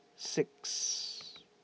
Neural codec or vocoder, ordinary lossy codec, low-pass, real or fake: none; none; none; real